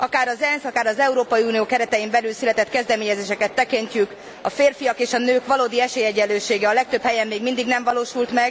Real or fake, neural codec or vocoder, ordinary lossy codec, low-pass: real; none; none; none